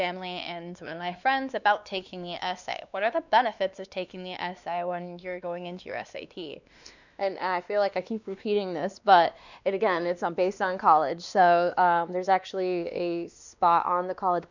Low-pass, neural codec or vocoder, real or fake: 7.2 kHz; codec, 16 kHz, 2 kbps, X-Codec, WavLM features, trained on Multilingual LibriSpeech; fake